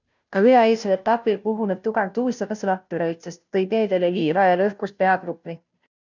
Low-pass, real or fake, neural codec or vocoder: 7.2 kHz; fake; codec, 16 kHz, 0.5 kbps, FunCodec, trained on Chinese and English, 25 frames a second